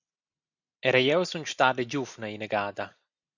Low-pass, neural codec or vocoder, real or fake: 7.2 kHz; none; real